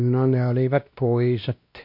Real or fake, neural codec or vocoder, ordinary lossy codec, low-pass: fake; codec, 16 kHz, 1 kbps, X-Codec, WavLM features, trained on Multilingual LibriSpeech; MP3, 32 kbps; 5.4 kHz